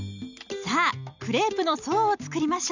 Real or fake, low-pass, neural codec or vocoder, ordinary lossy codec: real; 7.2 kHz; none; none